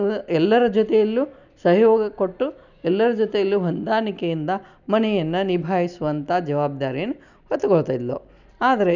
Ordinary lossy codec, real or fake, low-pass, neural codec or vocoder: none; real; 7.2 kHz; none